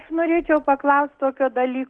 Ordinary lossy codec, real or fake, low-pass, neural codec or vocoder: Opus, 16 kbps; real; 9.9 kHz; none